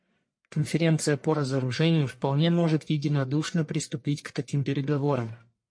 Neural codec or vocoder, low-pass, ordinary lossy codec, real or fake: codec, 44.1 kHz, 1.7 kbps, Pupu-Codec; 9.9 kHz; MP3, 48 kbps; fake